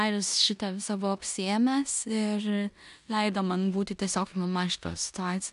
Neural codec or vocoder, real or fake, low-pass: codec, 16 kHz in and 24 kHz out, 0.9 kbps, LongCat-Audio-Codec, four codebook decoder; fake; 10.8 kHz